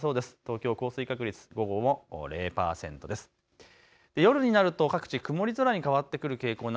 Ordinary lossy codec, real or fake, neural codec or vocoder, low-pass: none; real; none; none